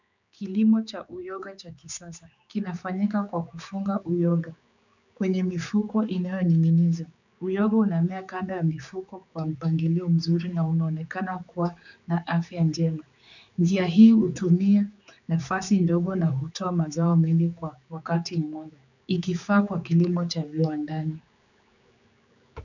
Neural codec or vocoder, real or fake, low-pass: codec, 16 kHz, 4 kbps, X-Codec, HuBERT features, trained on general audio; fake; 7.2 kHz